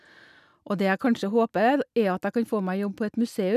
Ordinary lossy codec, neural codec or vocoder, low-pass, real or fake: none; none; 14.4 kHz; real